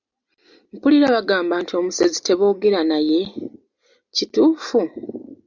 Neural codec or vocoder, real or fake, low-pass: none; real; 7.2 kHz